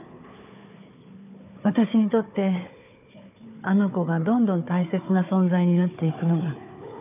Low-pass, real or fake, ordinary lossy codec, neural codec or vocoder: 3.6 kHz; fake; none; codec, 16 kHz, 4 kbps, FunCodec, trained on Chinese and English, 50 frames a second